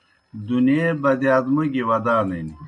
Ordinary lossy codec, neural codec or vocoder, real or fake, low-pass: MP3, 48 kbps; none; real; 10.8 kHz